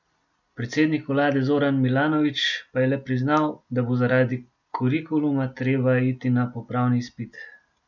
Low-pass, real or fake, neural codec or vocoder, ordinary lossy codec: 7.2 kHz; real; none; none